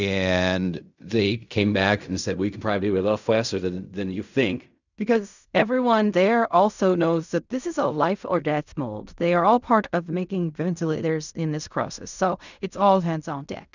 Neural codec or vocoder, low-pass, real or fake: codec, 16 kHz in and 24 kHz out, 0.4 kbps, LongCat-Audio-Codec, fine tuned four codebook decoder; 7.2 kHz; fake